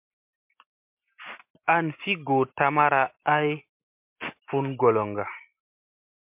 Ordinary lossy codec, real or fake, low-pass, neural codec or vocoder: MP3, 32 kbps; real; 3.6 kHz; none